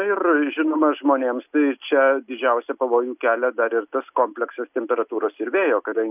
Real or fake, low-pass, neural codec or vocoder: real; 3.6 kHz; none